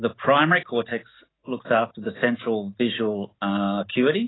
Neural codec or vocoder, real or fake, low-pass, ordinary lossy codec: none; real; 7.2 kHz; AAC, 16 kbps